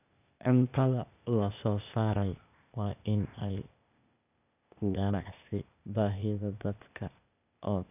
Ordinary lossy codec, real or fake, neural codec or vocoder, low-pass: none; fake; codec, 16 kHz, 0.8 kbps, ZipCodec; 3.6 kHz